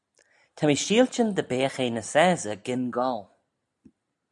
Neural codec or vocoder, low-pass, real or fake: none; 10.8 kHz; real